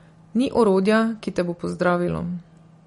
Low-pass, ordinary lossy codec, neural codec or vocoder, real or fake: 10.8 kHz; MP3, 48 kbps; none; real